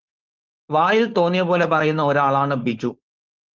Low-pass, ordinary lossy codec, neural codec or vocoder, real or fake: 7.2 kHz; Opus, 24 kbps; codec, 16 kHz, 4.8 kbps, FACodec; fake